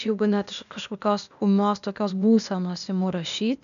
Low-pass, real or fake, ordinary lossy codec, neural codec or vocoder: 7.2 kHz; fake; MP3, 96 kbps; codec, 16 kHz, 0.8 kbps, ZipCodec